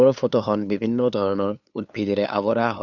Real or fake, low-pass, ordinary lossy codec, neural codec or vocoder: fake; 7.2 kHz; none; codec, 16 kHz, 2 kbps, FunCodec, trained on LibriTTS, 25 frames a second